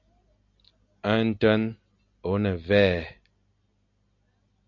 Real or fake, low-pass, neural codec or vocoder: real; 7.2 kHz; none